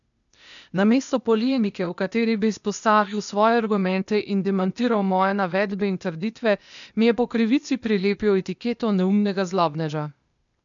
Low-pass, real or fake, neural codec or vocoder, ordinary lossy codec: 7.2 kHz; fake; codec, 16 kHz, 0.8 kbps, ZipCodec; none